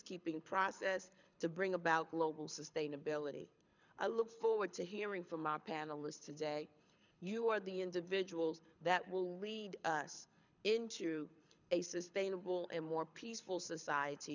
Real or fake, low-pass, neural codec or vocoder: fake; 7.2 kHz; codec, 24 kHz, 6 kbps, HILCodec